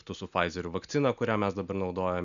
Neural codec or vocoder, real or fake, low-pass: none; real; 7.2 kHz